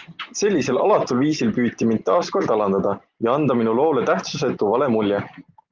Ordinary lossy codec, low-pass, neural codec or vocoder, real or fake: Opus, 32 kbps; 7.2 kHz; none; real